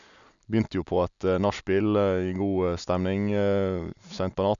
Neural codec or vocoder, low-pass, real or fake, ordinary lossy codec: none; 7.2 kHz; real; none